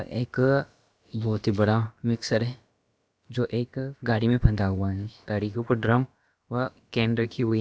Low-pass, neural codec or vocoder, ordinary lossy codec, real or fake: none; codec, 16 kHz, about 1 kbps, DyCAST, with the encoder's durations; none; fake